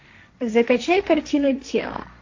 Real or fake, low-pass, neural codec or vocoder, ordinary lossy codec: fake; 7.2 kHz; codec, 16 kHz, 1.1 kbps, Voila-Tokenizer; AAC, 48 kbps